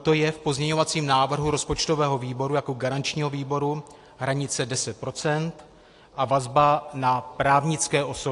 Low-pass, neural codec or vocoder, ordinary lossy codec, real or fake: 10.8 kHz; none; AAC, 48 kbps; real